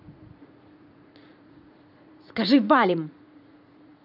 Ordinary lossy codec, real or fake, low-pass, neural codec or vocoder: none; fake; 5.4 kHz; vocoder, 44.1 kHz, 80 mel bands, Vocos